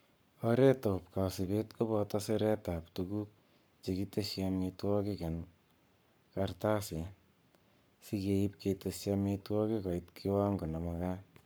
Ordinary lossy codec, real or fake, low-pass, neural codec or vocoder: none; fake; none; codec, 44.1 kHz, 7.8 kbps, Pupu-Codec